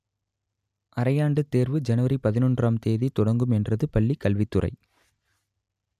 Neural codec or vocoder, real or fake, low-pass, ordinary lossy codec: none; real; 14.4 kHz; none